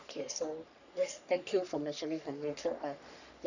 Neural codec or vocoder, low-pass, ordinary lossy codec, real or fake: codec, 44.1 kHz, 3.4 kbps, Pupu-Codec; 7.2 kHz; none; fake